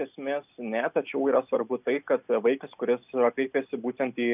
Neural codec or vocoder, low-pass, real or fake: none; 3.6 kHz; real